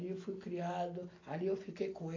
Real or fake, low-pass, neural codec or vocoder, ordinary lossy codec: real; 7.2 kHz; none; AAC, 32 kbps